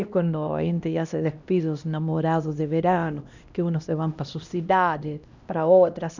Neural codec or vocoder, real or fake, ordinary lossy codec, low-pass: codec, 16 kHz, 1 kbps, X-Codec, HuBERT features, trained on LibriSpeech; fake; none; 7.2 kHz